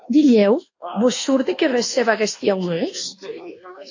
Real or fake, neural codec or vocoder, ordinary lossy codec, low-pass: fake; codec, 24 kHz, 1.2 kbps, DualCodec; AAC, 48 kbps; 7.2 kHz